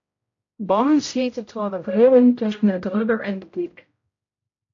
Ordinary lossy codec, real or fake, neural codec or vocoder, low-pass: AAC, 48 kbps; fake; codec, 16 kHz, 0.5 kbps, X-Codec, HuBERT features, trained on general audio; 7.2 kHz